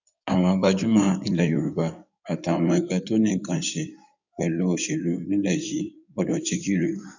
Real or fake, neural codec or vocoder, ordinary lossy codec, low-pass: fake; codec, 16 kHz in and 24 kHz out, 2.2 kbps, FireRedTTS-2 codec; none; 7.2 kHz